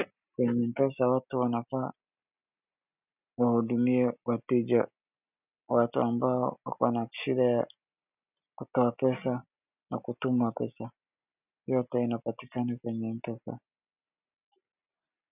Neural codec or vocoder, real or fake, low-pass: none; real; 3.6 kHz